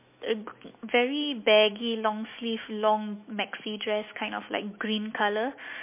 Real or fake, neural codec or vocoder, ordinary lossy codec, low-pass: fake; autoencoder, 48 kHz, 128 numbers a frame, DAC-VAE, trained on Japanese speech; MP3, 24 kbps; 3.6 kHz